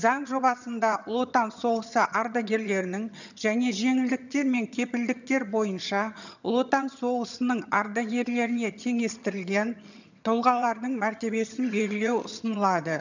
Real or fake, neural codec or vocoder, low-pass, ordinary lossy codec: fake; vocoder, 22.05 kHz, 80 mel bands, HiFi-GAN; 7.2 kHz; none